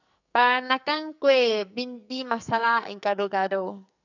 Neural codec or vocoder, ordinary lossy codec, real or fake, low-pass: codec, 44.1 kHz, 2.6 kbps, SNAC; none; fake; 7.2 kHz